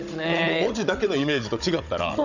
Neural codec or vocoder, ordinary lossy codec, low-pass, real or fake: codec, 16 kHz, 16 kbps, FunCodec, trained on Chinese and English, 50 frames a second; none; 7.2 kHz; fake